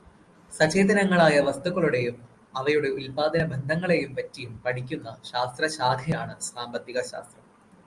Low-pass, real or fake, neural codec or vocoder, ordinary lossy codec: 10.8 kHz; real; none; Opus, 32 kbps